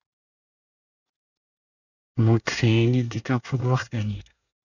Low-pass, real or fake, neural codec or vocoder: 7.2 kHz; fake; codec, 24 kHz, 1 kbps, SNAC